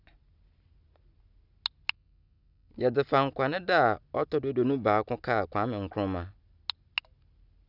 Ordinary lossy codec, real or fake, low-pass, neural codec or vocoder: none; real; 5.4 kHz; none